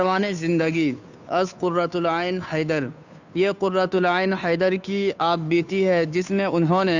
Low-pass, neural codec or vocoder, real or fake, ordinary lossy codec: 7.2 kHz; codec, 16 kHz, 2 kbps, FunCodec, trained on Chinese and English, 25 frames a second; fake; none